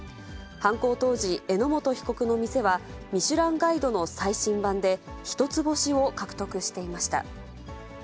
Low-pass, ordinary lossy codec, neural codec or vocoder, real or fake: none; none; none; real